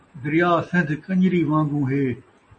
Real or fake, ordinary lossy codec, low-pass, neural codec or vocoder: real; MP3, 32 kbps; 10.8 kHz; none